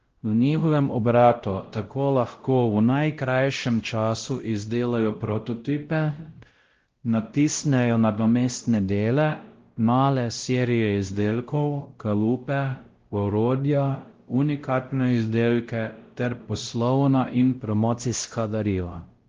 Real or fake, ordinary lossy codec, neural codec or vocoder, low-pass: fake; Opus, 16 kbps; codec, 16 kHz, 0.5 kbps, X-Codec, WavLM features, trained on Multilingual LibriSpeech; 7.2 kHz